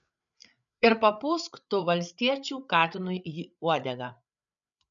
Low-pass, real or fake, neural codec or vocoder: 7.2 kHz; fake; codec, 16 kHz, 8 kbps, FreqCodec, larger model